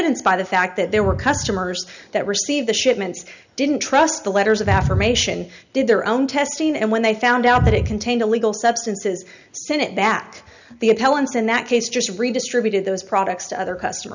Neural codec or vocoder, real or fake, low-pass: none; real; 7.2 kHz